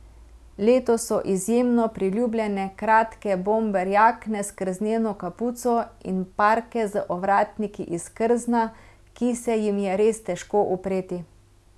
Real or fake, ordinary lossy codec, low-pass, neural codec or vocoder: real; none; none; none